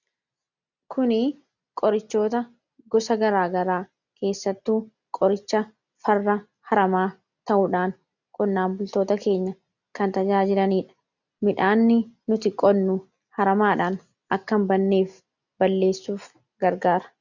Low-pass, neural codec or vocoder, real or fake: 7.2 kHz; none; real